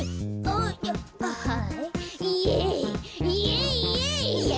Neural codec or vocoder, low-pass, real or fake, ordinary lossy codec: none; none; real; none